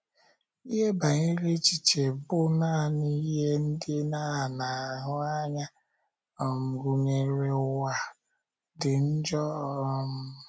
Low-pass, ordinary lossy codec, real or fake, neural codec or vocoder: none; none; real; none